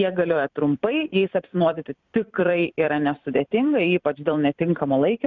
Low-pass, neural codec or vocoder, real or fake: 7.2 kHz; vocoder, 44.1 kHz, 128 mel bands every 256 samples, BigVGAN v2; fake